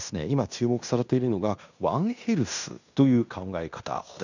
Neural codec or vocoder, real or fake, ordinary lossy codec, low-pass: codec, 16 kHz in and 24 kHz out, 0.9 kbps, LongCat-Audio-Codec, fine tuned four codebook decoder; fake; none; 7.2 kHz